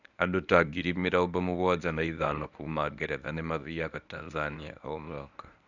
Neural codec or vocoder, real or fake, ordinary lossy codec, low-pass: codec, 24 kHz, 0.9 kbps, WavTokenizer, medium speech release version 1; fake; none; 7.2 kHz